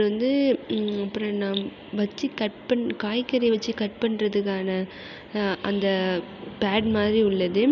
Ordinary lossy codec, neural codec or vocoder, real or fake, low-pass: none; none; real; 7.2 kHz